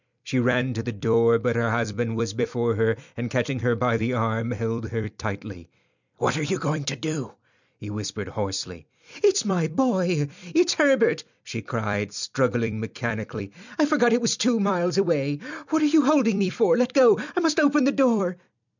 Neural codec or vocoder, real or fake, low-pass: vocoder, 44.1 kHz, 128 mel bands every 256 samples, BigVGAN v2; fake; 7.2 kHz